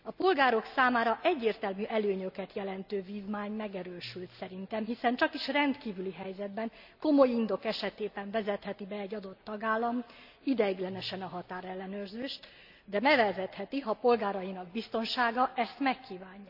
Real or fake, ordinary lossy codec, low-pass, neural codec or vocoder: real; none; 5.4 kHz; none